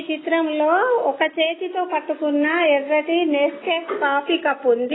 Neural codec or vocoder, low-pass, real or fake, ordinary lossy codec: none; 7.2 kHz; real; AAC, 16 kbps